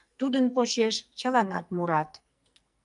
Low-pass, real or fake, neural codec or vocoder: 10.8 kHz; fake; codec, 44.1 kHz, 2.6 kbps, SNAC